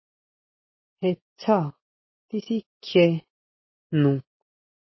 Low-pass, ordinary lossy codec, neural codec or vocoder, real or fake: 7.2 kHz; MP3, 24 kbps; none; real